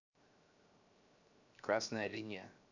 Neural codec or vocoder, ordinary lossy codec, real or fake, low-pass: codec, 16 kHz, 0.7 kbps, FocalCodec; none; fake; 7.2 kHz